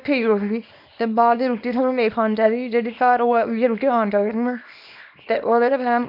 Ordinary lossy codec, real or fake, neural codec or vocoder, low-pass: none; fake; codec, 24 kHz, 0.9 kbps, WavTokenizer, small release; 5.4 kHz